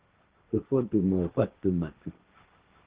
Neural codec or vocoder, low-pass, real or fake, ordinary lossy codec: codec, 16 kHz, 1.1 kbps, Voila-Tokenizer; 3.6 kHz; fake; Opus, 16 kbps